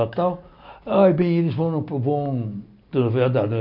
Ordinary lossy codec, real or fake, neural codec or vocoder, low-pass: none; real; none; 5.4 kHz